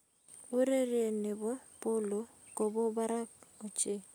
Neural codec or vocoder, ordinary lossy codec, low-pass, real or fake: none; none; none; real